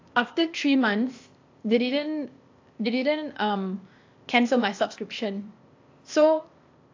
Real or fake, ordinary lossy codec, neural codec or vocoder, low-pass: fake; AAC, 48 kbps; codec, 16 kHz, 0.8 kbps, ZipCodec; 7.2 kHz